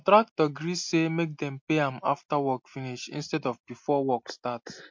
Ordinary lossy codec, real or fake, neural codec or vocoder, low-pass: MP3, 48 kbps; real; none; 7.2 kHz